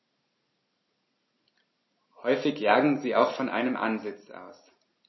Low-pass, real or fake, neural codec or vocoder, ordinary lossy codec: 7.2 kHz; real; none; MP3, 24 kbps